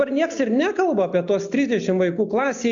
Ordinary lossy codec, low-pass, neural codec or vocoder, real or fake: MP3, 64 kbps; 7.2 kHz; none; real